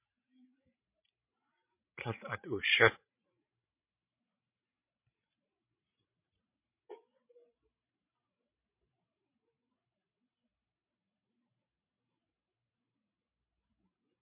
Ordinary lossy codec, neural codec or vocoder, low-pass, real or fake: MP3, 32 kbps; codec, 16 kHz, 16 kbps, FreqCodec, larger model; 3.6 kHz; fake